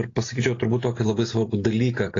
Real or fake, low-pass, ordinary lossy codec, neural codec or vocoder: real; 7.2 kHz; AAC, 32 kbps; none